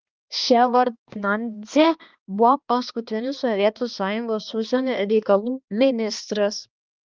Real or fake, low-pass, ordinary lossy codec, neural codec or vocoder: fake; 7.2 kHz; Opus, 24 kbps; codec, 16 kHz, 2 kbps, X-Codec, HuBERT features, trained on balanced general audio